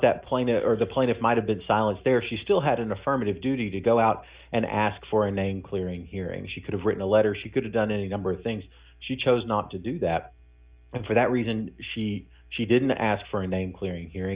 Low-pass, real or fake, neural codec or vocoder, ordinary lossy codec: 3.6 kHz; real; none; Opus, 64 kbps